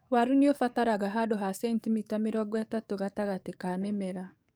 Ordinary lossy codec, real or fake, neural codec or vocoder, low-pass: none; fake; codec, 44.1 kHz, 7.8 kbps, DAC; none